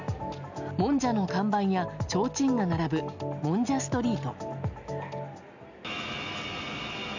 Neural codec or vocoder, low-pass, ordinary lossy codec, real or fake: none; 7.2 kHz; none; real